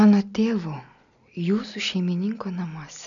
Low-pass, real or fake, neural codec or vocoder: 7.2 kHz; real; none